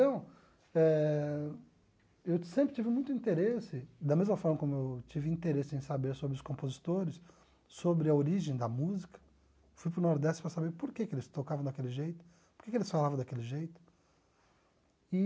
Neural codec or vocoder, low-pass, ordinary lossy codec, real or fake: none; none; none; real